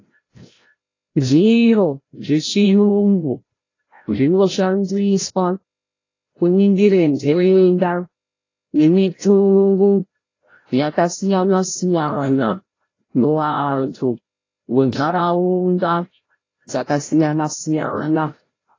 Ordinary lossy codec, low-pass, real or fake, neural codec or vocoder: AAC, 32 kbps; 7.2 kHz; fake; codec, 16 kHz, 0.5 kbps, FreqCodec, larger model